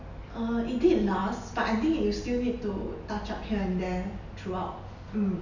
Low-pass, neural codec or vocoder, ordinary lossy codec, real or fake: 7.2 kHz; none; none; real